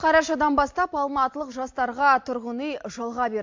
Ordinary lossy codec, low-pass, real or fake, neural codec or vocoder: MP3, 48 kbps; 7.2 kHz; real; none